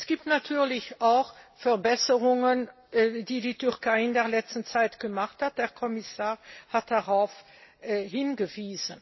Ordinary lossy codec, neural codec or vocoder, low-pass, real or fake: MP3, 24 kbps; none; 7.2 kHz; real